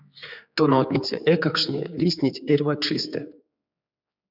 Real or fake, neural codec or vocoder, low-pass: fake; codec, 16 kHz, 4 kbps, X-Codec, HuBERT features, trained on general audio; 5.4 kHz